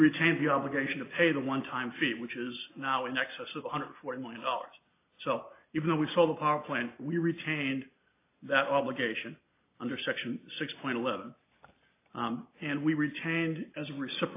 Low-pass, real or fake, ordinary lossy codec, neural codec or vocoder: 3.6 kHz; real; AAC, 24 kbps; none